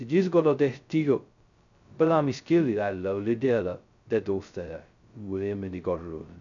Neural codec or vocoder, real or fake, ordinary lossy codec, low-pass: codec, 16 kHz, 0.2 kbps, FocalCodec; fake; none; 7.2 kHz